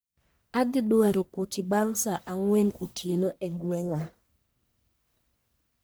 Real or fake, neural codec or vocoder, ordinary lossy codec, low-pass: fake; codec, 44.1 kHz, 1.7 kbps, Pupu-Codec; none; none